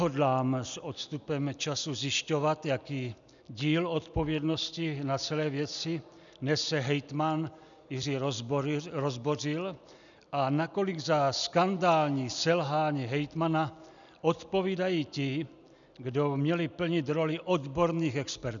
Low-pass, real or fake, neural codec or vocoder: 7.2 kHz; real; none